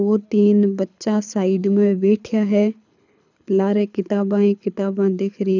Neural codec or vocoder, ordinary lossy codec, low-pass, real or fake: codec, 24 kHz, 6 kbps, HILCodec; none; 7.2 kHz; fake